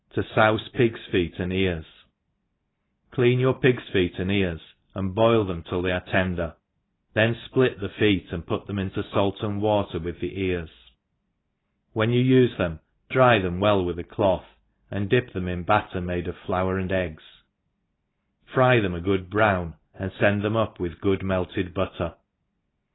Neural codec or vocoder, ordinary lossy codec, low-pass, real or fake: none; AAC, 16 kbps; 7.2 kHz; real